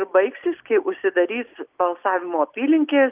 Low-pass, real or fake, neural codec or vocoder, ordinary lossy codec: 3.6 kHz; real; none; Opus, 24 kbps